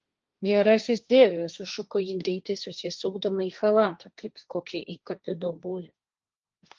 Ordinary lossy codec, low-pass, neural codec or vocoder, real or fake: Opus, 24 kbps; 7.2 kHz; codec, 16 kHz, 1.1 kbps, Voila-Tokenizer; fake